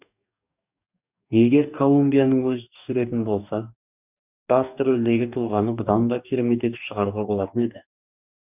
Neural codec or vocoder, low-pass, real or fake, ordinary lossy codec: codec, 44.1 kHz, 2.6 kbps, DAC; 3.6 kHz; fake; none